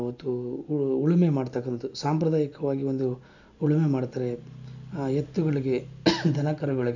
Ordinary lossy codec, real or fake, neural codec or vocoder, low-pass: none; real; none; 7.2 kHz